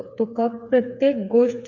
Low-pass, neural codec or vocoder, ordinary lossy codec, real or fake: 7.2 kHz; codec, 16 kHz, 4 kbps, FreqCodec, smaller model; none; fake